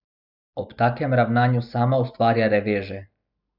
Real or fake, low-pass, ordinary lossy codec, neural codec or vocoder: real; 5.4 kHz; none; none